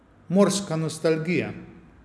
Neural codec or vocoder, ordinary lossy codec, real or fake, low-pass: none; none; real; none